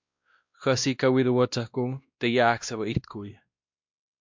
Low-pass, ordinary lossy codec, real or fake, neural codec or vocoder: 7.2 kHz; MP3, 64 kbps; fake; codec, 16 kHz, 1 kbps, X-Codec, WavLM features, trained on Multilingual LibriSpeech